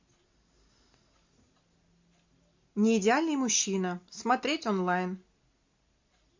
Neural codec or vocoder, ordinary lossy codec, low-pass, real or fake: none; MP3, 48 kbps; 7.2 kHz; real